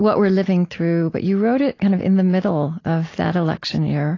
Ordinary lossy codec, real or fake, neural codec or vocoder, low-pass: AAC, 32 kbps; real; none; 7.2 kHz